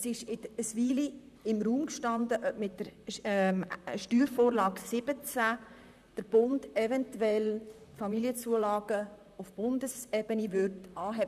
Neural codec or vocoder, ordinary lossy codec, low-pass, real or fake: vocoder, 44.1 kHz, 128 mel bands, Pupu-Vocoder; none; 14.4 kHz; fake